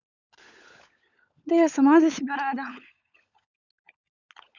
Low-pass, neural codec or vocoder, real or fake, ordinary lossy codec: 7.2 kHz; codec, 16 kHz, 16 kbps, FunCodec, trained on LibriTTS, 50 frames a second; fake; none